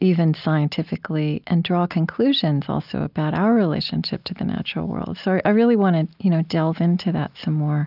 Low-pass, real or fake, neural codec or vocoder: 5.4 kHz; real; none